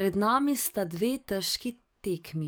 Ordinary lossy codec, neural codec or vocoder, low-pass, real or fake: none; codec, 44.1 kHz, 7.8 kbps, DAC; none; fake